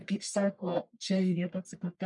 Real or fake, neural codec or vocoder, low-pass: fake; codec, 44.1 kHz, 1.7 kbps, Pupu-Codec; 10.8 kHz